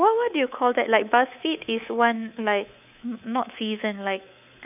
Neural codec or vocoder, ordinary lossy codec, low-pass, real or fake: codec, 24 kHz, 3.1 kbps, DualCodec; none; 3.6 kHz; fake